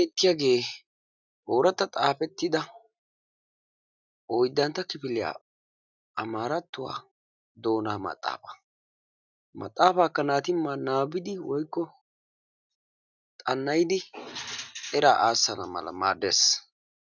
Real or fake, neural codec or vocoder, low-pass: real; none; 7.2 kHz